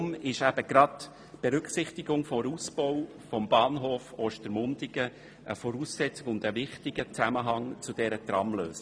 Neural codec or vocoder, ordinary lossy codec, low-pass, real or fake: none; none; none; real